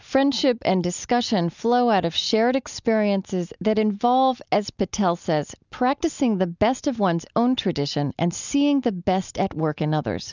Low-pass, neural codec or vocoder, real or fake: 7.2 kHz; none; real